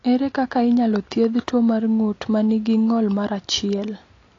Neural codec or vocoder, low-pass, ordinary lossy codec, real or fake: none; 7.2 kHz; AAC, 32 kbps; real